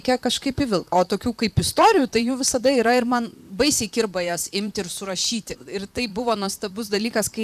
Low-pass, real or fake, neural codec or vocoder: 14.4 kHz; fake; vocoder, 44.1 kHz, 128 mel bands, Pupu-Vocoder